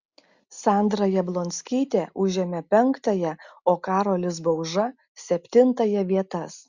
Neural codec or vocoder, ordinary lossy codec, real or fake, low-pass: none; Opus, 64 kbps; real; 7.2 kHz